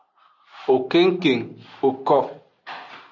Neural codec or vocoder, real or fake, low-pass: none; real; 7.2 kHz